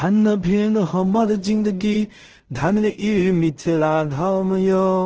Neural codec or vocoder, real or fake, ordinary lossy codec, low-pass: codec, 16 kHz in and 24 kHz out, 0.4 kbps, LongCat-Audio-Codec, two codebook decoder; fake; Opus, 16 kbps; 7.2 kHz